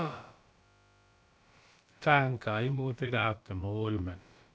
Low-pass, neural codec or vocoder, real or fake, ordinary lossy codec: none; codec, 16 kHz, about 1 kbps, DyCAST, with the encoder's durations; fake; none